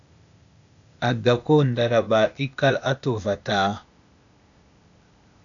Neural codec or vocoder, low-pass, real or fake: codec, 16 kHz, 0.8 kbps, ZipCodec; 7.2 kHz; fake